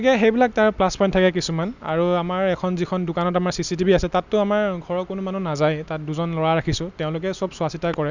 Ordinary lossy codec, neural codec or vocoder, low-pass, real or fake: none; none; 7.2 kHz; real